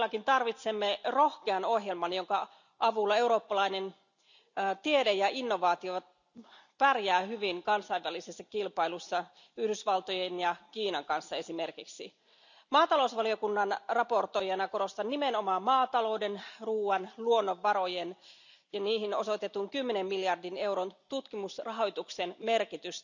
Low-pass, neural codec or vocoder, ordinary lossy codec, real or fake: 7.2 kHz; none; none; real